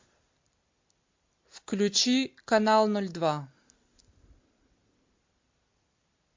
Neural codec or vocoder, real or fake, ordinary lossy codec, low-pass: vocoder, 44.1 kHz, 128 mel bands every 256 samples, BigVGAN v2; fake; MP3, 48 kbps; 7.2 kHz